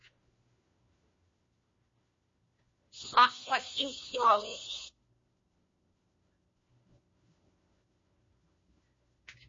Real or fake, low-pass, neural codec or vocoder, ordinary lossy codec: fake; 7.2 kHz; codec, 16 kHz, 1 kbps, FunCodec, trained on LibriTTS, 50 frames a second; MP3, 32 kbps